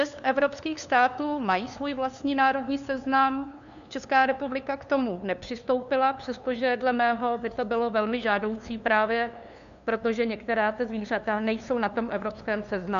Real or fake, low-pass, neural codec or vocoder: fake; 7.2 kHz; codec, 16 kHz, 2 kbps, FunCodec, trained on LibriTTS, 25 frames a second